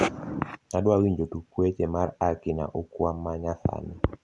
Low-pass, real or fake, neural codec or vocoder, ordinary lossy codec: 10.8 kHz; real; none; Opus, 64 kbps